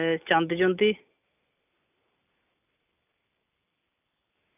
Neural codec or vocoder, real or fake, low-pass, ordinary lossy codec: none; real; 3.6 kHz; none